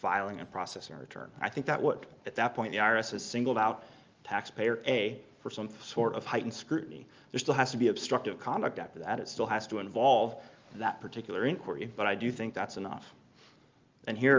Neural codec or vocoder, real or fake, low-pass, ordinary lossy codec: none; real; 7.2 kHz; Opus, 24 kbps